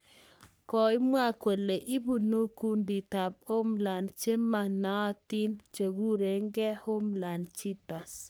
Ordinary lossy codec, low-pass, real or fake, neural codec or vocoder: none; none; fake; codec, 44.1 kHz, 3.4 kbps, Pupu-Codec